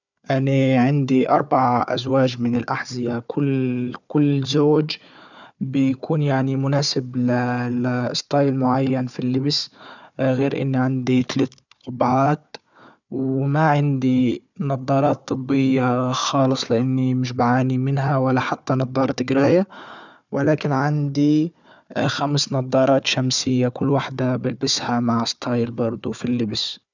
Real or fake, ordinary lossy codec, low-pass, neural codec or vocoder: fake; none; 7.2 kHz; codec, 16 kHz, 4 kbps, FunCodec, trained on Chinese and English, 50 frames a second